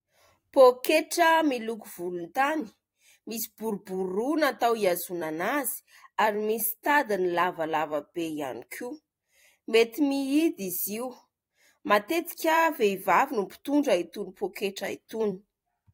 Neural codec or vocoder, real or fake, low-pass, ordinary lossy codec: vocoder, 44.1 kHz, 128 mel bands every 256 samples, BigVGAN v2; fake; 19.8 kHz; AAC, 48 kbps